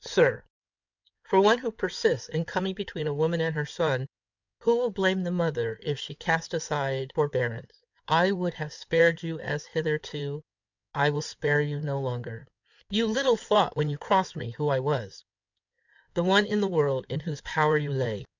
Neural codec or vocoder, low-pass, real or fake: codec, 16 kHz in and 24 kHz out, 2.2 kbps, FireRedTTS-2 codec; 7.2 kHz; fake